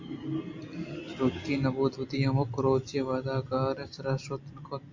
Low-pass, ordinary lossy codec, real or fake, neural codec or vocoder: 7.2 kHz; MP3, 48 kbps; real; none